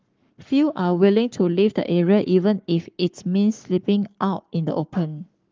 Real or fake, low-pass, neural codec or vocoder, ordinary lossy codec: fake; 7.2 kHz; codec, 44.1 kHz, 7.8 kbps, Pupu-Codec; Opus, 32 kbps